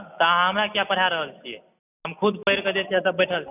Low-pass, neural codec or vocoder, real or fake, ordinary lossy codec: 3.6 kHz; none; real; none